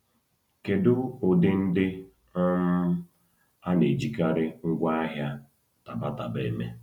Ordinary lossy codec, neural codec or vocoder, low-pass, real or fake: none; none; 19.8 kHz; real